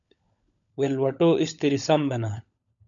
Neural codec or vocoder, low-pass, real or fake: codec, 16 kHz, 16 kbps, FunCodec, trained on LibriTTS, 50 frames a second; 7.2 kHz; fake